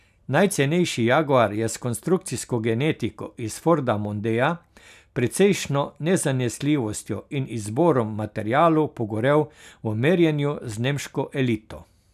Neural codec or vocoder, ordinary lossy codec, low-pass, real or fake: none; none; 14.4 kHz; real